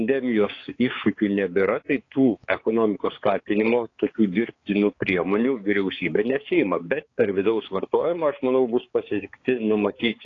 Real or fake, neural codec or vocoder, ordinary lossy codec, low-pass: fake; codec, 16 kHz, 4 kbps, X-Codec, HuBERT features, trained on balanced general audio; AAC, 32 kbps; 7.2 kHz